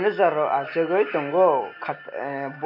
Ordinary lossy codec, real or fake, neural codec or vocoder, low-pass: MP3, 48 kbps; real; none; 5.4 kHz